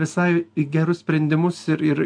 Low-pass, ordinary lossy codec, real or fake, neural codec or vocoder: 9.9 kHz; AAC, 64 kbps; real; none